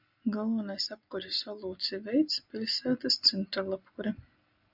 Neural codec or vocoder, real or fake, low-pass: none; real; 5.4 kHz